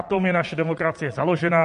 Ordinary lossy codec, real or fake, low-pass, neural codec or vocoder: MP3, 48 kbps; fake; 9.9 kHz; vocoder, 22.05 kHz, 80 mel bands, Vocos